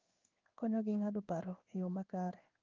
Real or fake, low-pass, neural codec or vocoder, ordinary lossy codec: fake; 7.2 kHz; codec, 16 kHz in and 24 kHz out, 1 kbps, XY-Tokenizer; Opus, 24 kbps